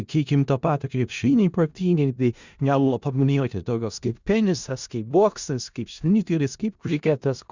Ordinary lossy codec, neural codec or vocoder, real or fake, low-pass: Opus, 64 kbps; codec, 16 kHz in and 24 kHz out, 0.4 kbps, LongCat-Audio-Codec, four codebook decoder; fake; 7.2 kHz